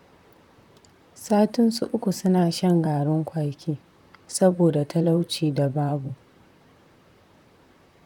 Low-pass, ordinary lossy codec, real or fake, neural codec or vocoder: 19.8 kHz; none; fake; vocoder, 44.1 kHz, 128 mel bands, Pupu-Vocoder